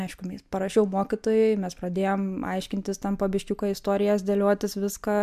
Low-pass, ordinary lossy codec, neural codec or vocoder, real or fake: 14.4 kHz; MP3, 96 kbps; none; real